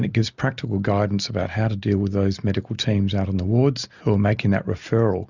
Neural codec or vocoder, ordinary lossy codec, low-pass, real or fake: none; Opus, 64 kbps; 7.2 kHz; real